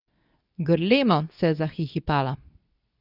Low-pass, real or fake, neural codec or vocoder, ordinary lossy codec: 5.4 kHz; fake; codec, 24 kHz, 0.9 kbps, WavTokenizer, medium speech release version 2; none